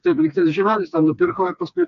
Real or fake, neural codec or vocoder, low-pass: fake; codec, 16 kHz, 2 kbps, FreqCodec, smaller model; 7.2 kHz